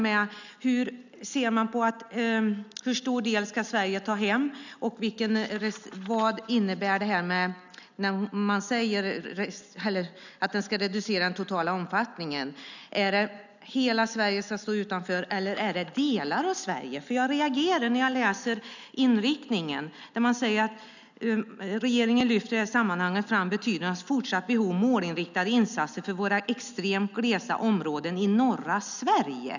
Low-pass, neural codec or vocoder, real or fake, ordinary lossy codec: 7.2 kHz; none; real; none